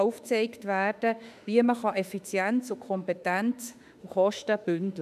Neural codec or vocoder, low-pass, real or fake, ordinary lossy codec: autoencoder, 48 kHz, 32 numbers a frame, DAC-VAE, trained on Japanese speech; 14.4 kHz; fake; none